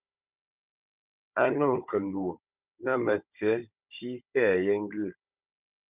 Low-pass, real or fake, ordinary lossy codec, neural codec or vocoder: 3.6 kHz; fake; Opus, 64 kbps; codec, 16 kHz, 16 kbps, FunCodec, trained on Chinese and English, 50 frames a second